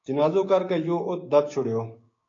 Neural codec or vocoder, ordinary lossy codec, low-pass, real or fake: codec, 16 kHz, 6 kbps, DAC; AAC, 32 kbps; 7.2 kHz; fake